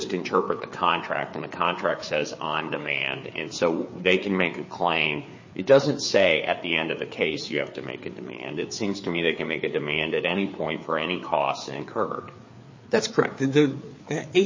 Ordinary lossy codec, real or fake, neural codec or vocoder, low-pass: MP3, 32 kbps; fake; codec, 16 kHz, 4 kbps, FreqCodec, larger model; 7.2 kHz